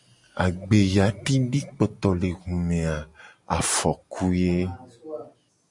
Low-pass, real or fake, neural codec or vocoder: 10.8 kHz; real; none